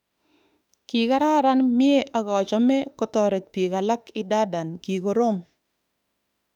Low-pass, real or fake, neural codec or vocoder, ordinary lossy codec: 19.8 kHz; fake; autoencoder, 48 kHz, 32 numbers a frame, DAC-VAE, trained on Japanese speech; none